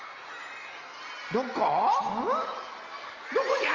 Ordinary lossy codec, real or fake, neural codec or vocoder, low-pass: Opus, 32 kbps; real; none; 7.2 kHz